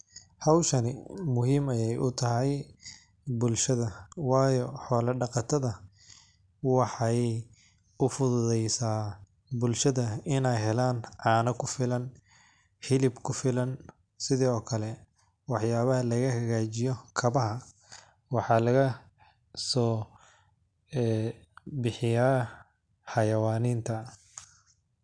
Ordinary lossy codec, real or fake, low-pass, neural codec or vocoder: none; real; 9.9 kHz; none